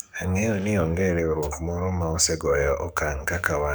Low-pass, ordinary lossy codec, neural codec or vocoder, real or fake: none; none; codec, 44.1 kHz, 7.8 kbps, DAC; fake